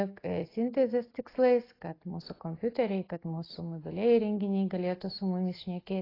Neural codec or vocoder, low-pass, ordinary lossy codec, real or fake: none; 5.4 kHz; AAC, 24 kbps; real